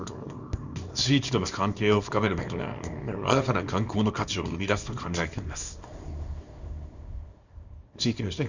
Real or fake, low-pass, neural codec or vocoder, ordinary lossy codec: fake; 7.2 kHz; codec, 24 kHz, 0.9 kbps, WavTokenizer, small release; Opus, 64 kbps